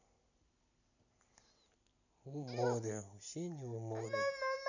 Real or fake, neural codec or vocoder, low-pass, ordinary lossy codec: real; none; 7.2 kHz; none